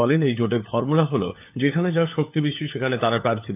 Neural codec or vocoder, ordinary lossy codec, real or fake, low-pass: codec, 16 kHz, 4 kbps, FunCodec, trained on LibriTTS, 50 frames a second; AAC, 32 kbps; fake; 3.6 kHz